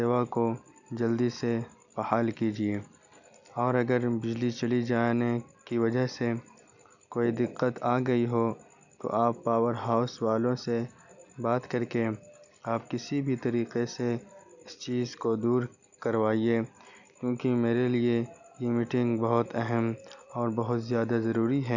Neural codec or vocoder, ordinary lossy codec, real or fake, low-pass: none; none; real; 7.2 kHz